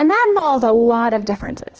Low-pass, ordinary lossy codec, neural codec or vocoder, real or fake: 7.2 kHz; Opus, 16 kbps; codec, 16 kHz, 2 kbps, X-Codec, HuBERT features, trained on balanced general audio; fake